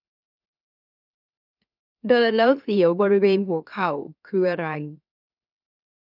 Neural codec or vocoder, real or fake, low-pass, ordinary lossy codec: autoencoder, 44.1 kHz, a latent of 192 numbers a frame, MeloTTS; fake; 5.4 kHz; none